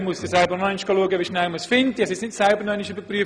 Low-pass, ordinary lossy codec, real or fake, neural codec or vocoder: 9.9 kHz; none; real; none